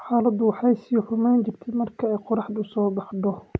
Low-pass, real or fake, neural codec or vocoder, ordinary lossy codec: none; real; none; none